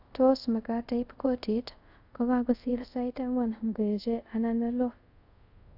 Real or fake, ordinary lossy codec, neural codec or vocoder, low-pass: fake; AAC, 48 kbps; codec, 24 kHz, 0.5 kbps, DualCodec; 5.4 kHz